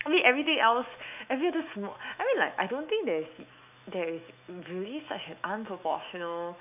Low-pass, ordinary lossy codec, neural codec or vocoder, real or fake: 3.6 kHz; AAC, 32 kbps; autoencoder, 48 kHz, 128 numbers a frame, DAC-VAE, trained on Japanese speech; fake